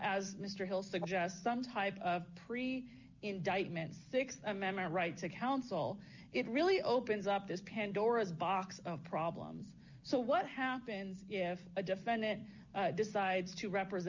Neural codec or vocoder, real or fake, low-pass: none; real; 7.2 kHz